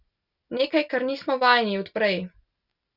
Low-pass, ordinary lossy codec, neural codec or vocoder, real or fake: 5.4 kHz; Opus, 64 kbps; none; real